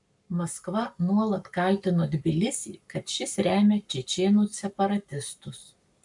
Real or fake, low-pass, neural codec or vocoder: fake; 10.8 kHz; codec, 44.1 kHz, 7.8 kbps, Pupu-Codec